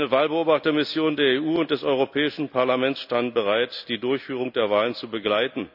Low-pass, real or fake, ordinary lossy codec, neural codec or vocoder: 5.4 kHz; real; none; none